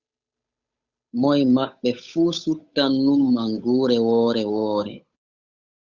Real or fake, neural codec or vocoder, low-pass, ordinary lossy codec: fake; codec, 16 kHz, 8 kbps, FunCodec, trained on Chinese and English, 25 frames a second; 7.2 kHz; Opus, 64 kbps